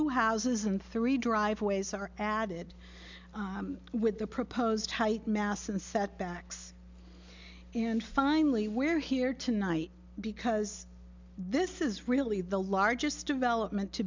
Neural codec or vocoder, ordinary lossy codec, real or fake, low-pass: none; MP3, 64 kbps; real; 7.2 kHz